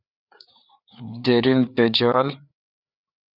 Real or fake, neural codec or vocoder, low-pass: fake; codec, 16 kHz, 4 kbps, FreqCodec, larger model; 5.4 kHz